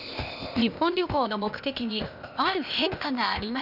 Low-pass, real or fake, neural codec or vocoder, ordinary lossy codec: 5.4 kHz; fake; codec, 16 kHz, 0.8 kbps, ZipCodec; none